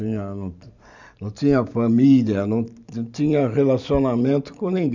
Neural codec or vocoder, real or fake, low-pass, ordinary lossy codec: vocoder, 44.1 kHz, 128 mel bands every 512 samples, BigVGAN v2; fake; 7.2 kHz; none